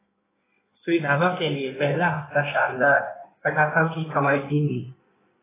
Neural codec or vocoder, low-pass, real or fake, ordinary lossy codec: codec, 16 kHz in and 24 kHz out, 1.1 kbps, FireRedTTS-2 codec; 3.6 kHz; fake; AAC, 16 kbps